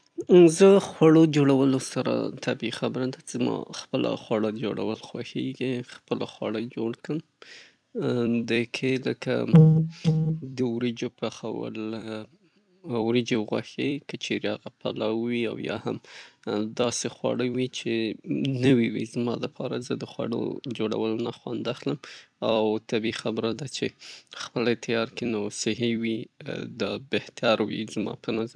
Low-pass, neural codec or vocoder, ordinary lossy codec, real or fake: 9.9 kHz; vocoder, 44.1 kHz, 128 mel bands every 256 samples, BigVGAN v2; none; fake